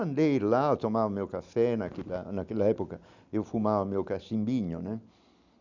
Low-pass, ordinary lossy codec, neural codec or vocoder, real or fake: 7.2 kHz; none; none; real